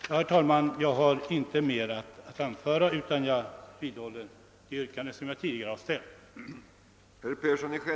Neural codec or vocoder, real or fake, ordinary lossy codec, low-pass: none; real; none; none